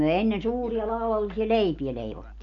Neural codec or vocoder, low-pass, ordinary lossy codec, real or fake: none; 7.2 kHz; none; real